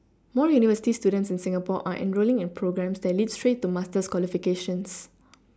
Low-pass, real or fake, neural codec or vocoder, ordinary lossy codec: none; real; none; none